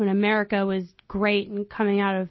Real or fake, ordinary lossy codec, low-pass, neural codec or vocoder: real; MP3, 24 kbps; 7.2 kHz; none